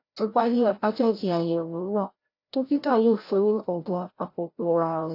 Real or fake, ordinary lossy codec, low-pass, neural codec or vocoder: fake; AAC, 32 kbps; 5.4 kHz; codec, 16 kHz, 0.5 kbps, FreqCodec, larger model